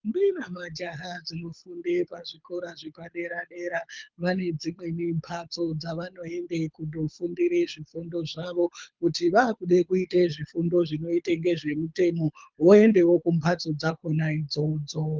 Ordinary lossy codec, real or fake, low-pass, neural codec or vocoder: Opus, 24 kbps; fake; 7.2 kHz; codec, 24 kHz, 6 kbps, HILCodec